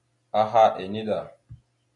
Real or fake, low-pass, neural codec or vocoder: real; 10.8 kHz; none